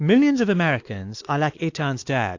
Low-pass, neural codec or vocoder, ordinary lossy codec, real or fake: 7.2 kHz; codec, 16 kHz, 2 kbps, X-Codec, WavLM features, trained on Multilingual LibriSpeech; AAC, 48 kbps; fake